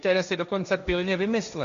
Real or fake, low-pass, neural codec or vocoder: fake; 7.2 kHz; codec, 16 kHz, 1.1 kbps, Voila-Tokenizer